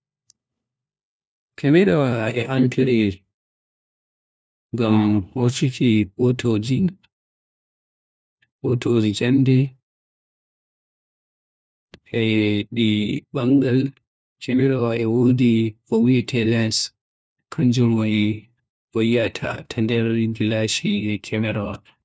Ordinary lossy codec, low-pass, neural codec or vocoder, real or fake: none; none; codec, 16 kHz, 1 kbps, FunCodec, trained on LibriTTS, 50 frames a second; fake